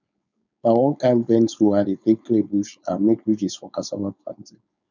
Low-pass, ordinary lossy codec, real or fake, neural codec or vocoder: 7.2 kHz; none; fake; codec, 16 kHz, 4.8 kbps, FACodec